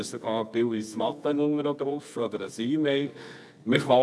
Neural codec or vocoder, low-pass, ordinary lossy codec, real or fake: codec, 24 kHz, 0.9 kbps, WavTokenizer, medium music audio release; none; none; fake